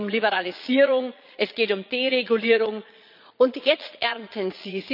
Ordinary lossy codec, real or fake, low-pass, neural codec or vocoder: none; fake; 5.4 kHz; vocoder, 22.05 kHz, 80 mel bands, Vocos